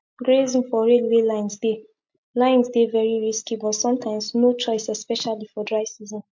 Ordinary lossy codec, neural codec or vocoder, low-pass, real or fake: MP3, 48 kbps; none; 7.2 kHz; real